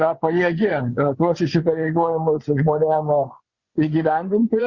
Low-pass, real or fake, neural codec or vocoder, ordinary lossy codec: 7.2 kHz; fake; vocoder, 24 kHz, 100 mel bands, Vocos; Opus, 64 kbps